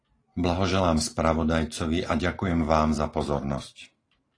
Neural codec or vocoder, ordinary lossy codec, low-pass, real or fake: none; AAC, 32 kbps; 9.9 kHz; real